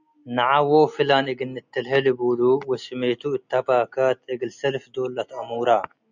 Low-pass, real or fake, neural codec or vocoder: 7.2 kHz; real; none